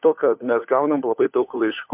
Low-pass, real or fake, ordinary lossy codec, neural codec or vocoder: 3.6 kHz; fake; MP3, 32 kbps; codec, 16 kHz, 4 kbps, FunCodec, trained on LibriTTS, 50 frames a second